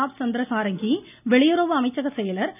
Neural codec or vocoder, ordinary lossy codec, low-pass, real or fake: none; none; 3.6 kHz; real